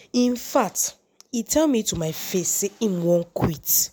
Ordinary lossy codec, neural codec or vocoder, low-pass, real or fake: none; none; none; real